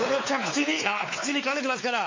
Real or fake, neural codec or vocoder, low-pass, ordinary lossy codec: fake; codec, 16 kHz, 4 kbps, X-Codec, WavLM features, trained on Multilingual LibriSpeech; 7.2 kHz; MP3, 32 kbps